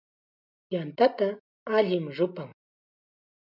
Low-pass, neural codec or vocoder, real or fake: 5.4 kHz; none; real